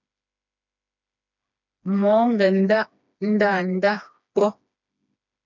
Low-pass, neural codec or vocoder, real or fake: 7.2 kHz; codec, 16 kHz, 2 kbps, FreqCodec, smaller model; fake